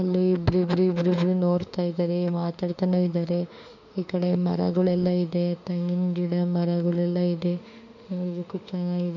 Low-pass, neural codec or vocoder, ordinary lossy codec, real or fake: 7.2 kHz; autoencoder, 48 kHz, 32 numbers a frame, DAC-VAE, trained on Japanese speech; none; fake